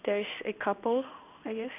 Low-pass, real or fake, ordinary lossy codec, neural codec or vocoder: 3.6 kHz; fake; none; codec, 16 kHz in and 24 kHz out, 1 kbps, XY-Tokenizer